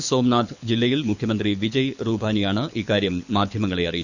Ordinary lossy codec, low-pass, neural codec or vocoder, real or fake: none; 7.2 kHz; codec, 24 kHz, 6 kbps, HILCodec; fake